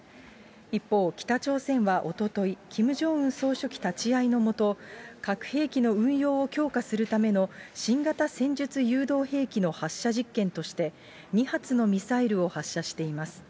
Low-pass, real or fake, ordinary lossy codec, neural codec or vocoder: none; real; none; none